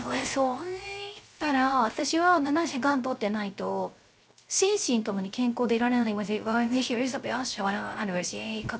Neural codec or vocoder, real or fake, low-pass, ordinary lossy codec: codec, 16 kHz, 0.3 kbps, FocalCodec; fake; none; none